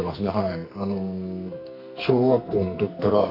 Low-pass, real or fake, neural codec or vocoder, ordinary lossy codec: 5.4 kHz; fake; codec, 44.1 kHz, 2.6 kbps, SNAC; none